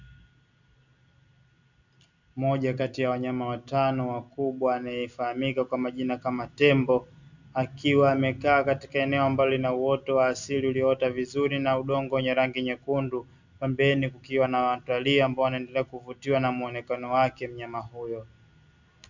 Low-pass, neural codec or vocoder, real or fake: 7.2 kHz; none; real